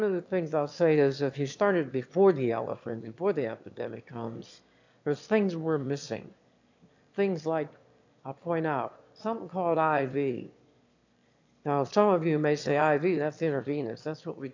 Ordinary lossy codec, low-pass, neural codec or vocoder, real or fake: AAC, 48 kbps; 7.2 kHz; autoencoder, 22.05 kHz, a latent of 192 numbers a frame, VITS, trained on one speaker; fake